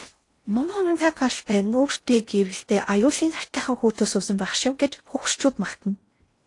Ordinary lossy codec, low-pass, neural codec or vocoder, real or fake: AAC, 48 kbps; 10.8 kHz; codec, 16 kHz in and 24 kHz out, 0.6 kbps, FocalCodec, streaming, 4096 codes; fake